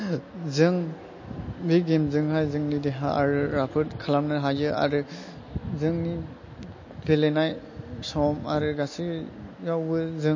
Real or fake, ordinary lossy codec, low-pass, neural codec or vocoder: real; MP3, 32 kbps; 7.2 kHz; none